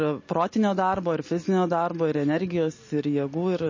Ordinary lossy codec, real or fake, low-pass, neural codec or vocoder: MP3, 32 kbps; real; 7.2 kHz; none